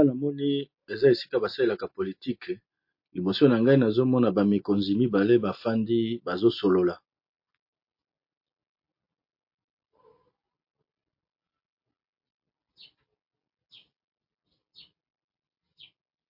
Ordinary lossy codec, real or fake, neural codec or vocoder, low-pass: MP3, 32 kbps; real; none; 5.4 kHz